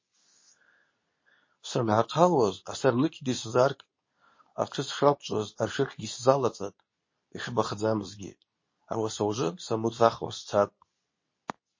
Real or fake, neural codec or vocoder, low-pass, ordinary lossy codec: fake; codec, 24 kHz, 0.9 kbps, WavTokenizer, medium speech release version 2; 7.2 kHz; MP3, 32 kbps